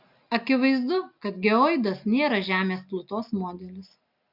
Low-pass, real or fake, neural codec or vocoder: 5.4 kHz; real; none